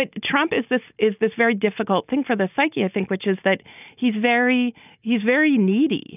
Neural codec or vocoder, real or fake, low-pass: none; real; 3.6 kHz